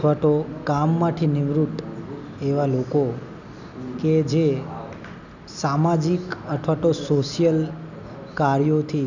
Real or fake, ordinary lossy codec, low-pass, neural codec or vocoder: real; none; 7.2 kHz; none